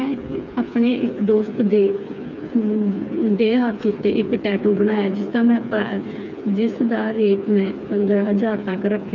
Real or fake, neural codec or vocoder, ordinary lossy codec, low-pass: fake; codec, 16 kHz, 4 kbps, FreqCodec, smaller model; none; 7.2 kHz